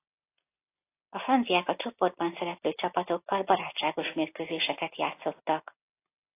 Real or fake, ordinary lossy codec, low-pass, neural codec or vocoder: real; AAC, 24 kbps; 3.6 kHz; none